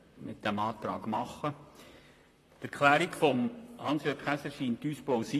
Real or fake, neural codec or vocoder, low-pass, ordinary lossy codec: fake; vocoder, 44.1 kHz, 128 mel bands, Pupu-Vocoder; 14.4 kHz; AAC, 48 kbps